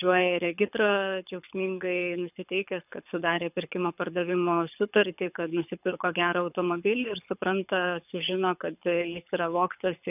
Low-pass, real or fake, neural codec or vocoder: 3.6 kHz; fake; codec, 24 kHz, 6 kbps, HILCodec